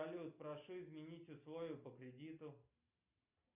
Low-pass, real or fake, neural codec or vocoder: 3.6 kHz; real; none